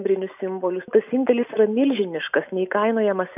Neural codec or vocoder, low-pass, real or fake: none; 3.6 kHz; real